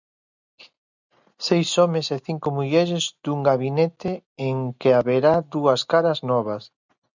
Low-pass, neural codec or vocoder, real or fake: 7.2 kHz; none; real